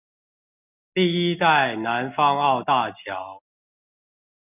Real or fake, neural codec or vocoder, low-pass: real; none; 3.6 kHz